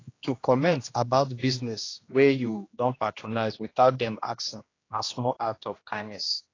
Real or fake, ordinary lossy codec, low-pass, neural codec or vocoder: fake; AAC, 32 kbps; 7.2 kHz; codec, 16 kHz, 1 kbps, X-Codec, HuBERT features, trained on general audio